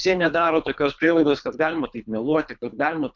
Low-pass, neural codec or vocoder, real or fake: 7.2 kHz; codec, 24 kHz, 3 kbps, HILCodec; fake